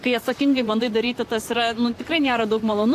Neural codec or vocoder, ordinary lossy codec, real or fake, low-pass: vocoder, 44.1 kHz, 128 mel bands, Pupu-Vocoder; AAC, 64 kbps; fake; 14.4 kHz